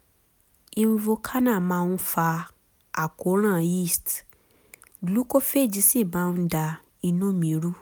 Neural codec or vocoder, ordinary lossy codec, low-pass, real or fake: none; none; none; real